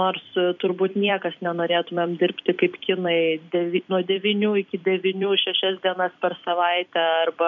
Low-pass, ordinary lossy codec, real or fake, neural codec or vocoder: 7.2 kHz; MP3, 64 kbps; fake; vocoder, 44.1 kHz, 128 mel bands every 256 samples, BigVGAN v2